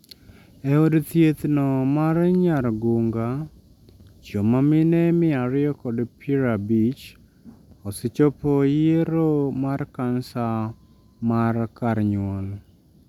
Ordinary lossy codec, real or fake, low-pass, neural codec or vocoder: none; real; 19.8 kHz; none